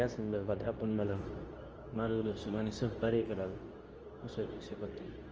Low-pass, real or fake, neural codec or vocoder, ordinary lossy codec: 7.2 kHz; fake; codec, 24 kHz, 0.9 kbps, WavTokenizer, medium speech release version 2; Opus, 24 kbps